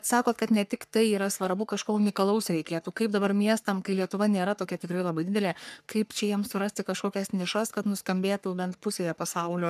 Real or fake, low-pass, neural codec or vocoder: fake; 14.4 kHz; codec, 44.1 kHz, 3.4 kbps, Pupu-Codec